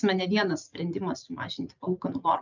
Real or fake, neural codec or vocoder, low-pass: real; none; 7.2 kHz